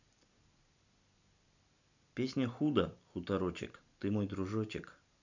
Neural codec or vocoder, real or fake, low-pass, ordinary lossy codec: none; real; 7.2 kHz; none